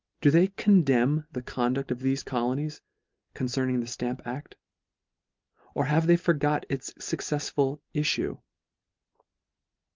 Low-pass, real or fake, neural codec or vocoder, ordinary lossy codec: 7.2 kHz; real; none; Opus, 32 kbps